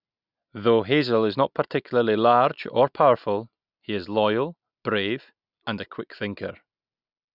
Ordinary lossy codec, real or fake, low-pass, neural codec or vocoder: none; real; 5.4 kHz; none